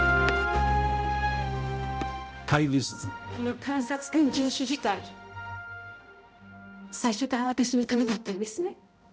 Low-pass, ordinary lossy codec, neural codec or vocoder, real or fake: none; none; codec, 16 kHz, 0.5 kbps, X-Codec, HuBERT features, trained on balanced general audio; fake